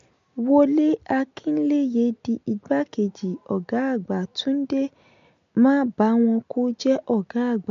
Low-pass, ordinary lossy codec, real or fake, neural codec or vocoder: 7.2 kHz; MP3, 48 kbps; real; none